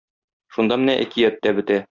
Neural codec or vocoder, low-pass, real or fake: none; 7.2 kHz; real